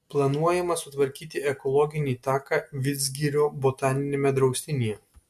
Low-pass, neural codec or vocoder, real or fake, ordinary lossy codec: 14.4 kHz; none; real; MP3, 96 kbps